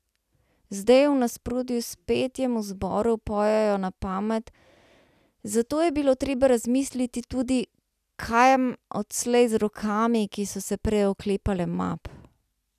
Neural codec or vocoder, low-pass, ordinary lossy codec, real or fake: none; 14.4 kHz; none; real